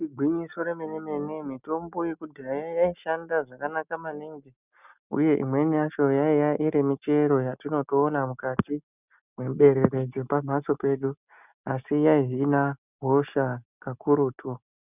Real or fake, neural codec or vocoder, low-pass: real; none; 3.6 kHz